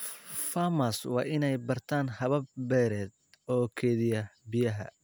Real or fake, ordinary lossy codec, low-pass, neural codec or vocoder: real; none; none; none